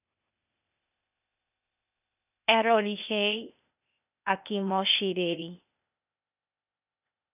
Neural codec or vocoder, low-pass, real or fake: codec, 16 kHz, 0.8 kbps, ZipCodec; 3.6 kHz; fake